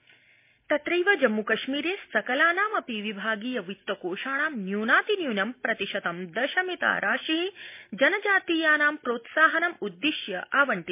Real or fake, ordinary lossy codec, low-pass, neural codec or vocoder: real; MP3, 24 kbps; 3.6 kHz; none